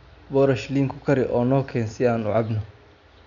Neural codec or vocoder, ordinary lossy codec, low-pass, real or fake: none; none; 7.2 kHz; real